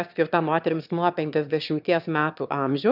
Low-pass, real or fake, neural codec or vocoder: 5.4 kHz; fake; autoencoder, 22.05 kHz, a latent of 192 numbers a frame, VITS, trained on one speaker